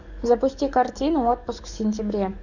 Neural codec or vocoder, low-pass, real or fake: codec, 44.1 kHz, 7.8 kbps, DAC; 7.2 kHz; fake